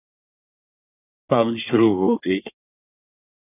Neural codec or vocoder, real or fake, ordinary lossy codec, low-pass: codec, 24 kHz, 1 kbps, SNAC; fake; AAC, 24 kbps; 3.6 kHz